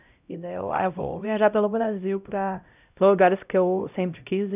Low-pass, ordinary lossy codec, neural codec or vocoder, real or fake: 3.6 kHz; none; codec, 16 kHz, 0.5 kbps, X-Codec, HuBERT features, trained on LibriSpeech; fake